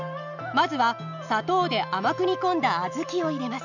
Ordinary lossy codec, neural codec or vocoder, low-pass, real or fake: none; none; 7.2 kHz; real